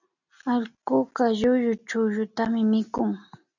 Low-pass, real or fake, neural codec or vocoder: 7.2 kHz; real; none